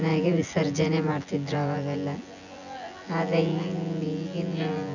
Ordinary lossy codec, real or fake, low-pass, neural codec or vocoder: none; fake; 7.2 kHz; vocoder, 24 kHz, 100 mel bands, Vocos